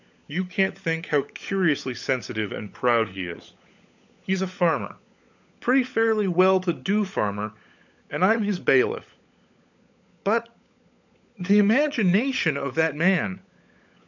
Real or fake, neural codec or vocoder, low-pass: fake; codec, 16 kHz, 16 kbps, FunCodec, trained on LibriTTS, 50 frames a second; 7.2 kHz